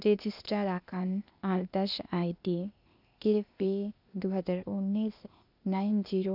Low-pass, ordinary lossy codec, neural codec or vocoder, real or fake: 5.4 kHz; none; codec, 16 kHz, 0.8 kbps, ZipCodec; fake